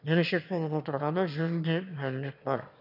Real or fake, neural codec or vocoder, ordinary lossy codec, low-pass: fake; autoencoder, 22.05 kHz, a latent of 192 numbers a frame, VITS, trained on one speaker; none; 5.4 kHz